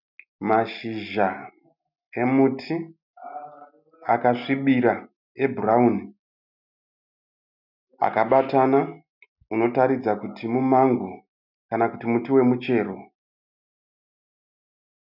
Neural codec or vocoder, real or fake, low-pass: none; real; 5.4 kHz